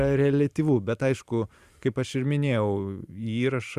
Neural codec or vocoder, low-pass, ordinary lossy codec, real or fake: vocoder, 44.1 kHz, 128 mel bands every 512 samples, BigVGAN v2; 14.4 kHz; Opus, 64 kbps; fake